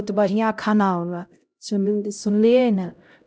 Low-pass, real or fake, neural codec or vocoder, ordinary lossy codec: none; fake; codec, 16 kHz, 0.5 kbps, X-Codec, HuBERT features, trained on LibriSpeech; none